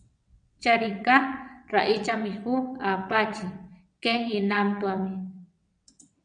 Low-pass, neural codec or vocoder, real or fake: 9.9 kHz; vocoder, 22.05 kHz, 80 mel bands, WaveNeXt; fake